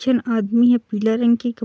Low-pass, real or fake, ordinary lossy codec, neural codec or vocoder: none; real; none; none